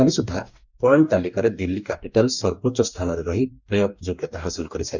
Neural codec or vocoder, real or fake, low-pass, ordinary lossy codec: codec, 44.1 kHz, 2.6 kbps, DAC; fake; 7.2 kHz; none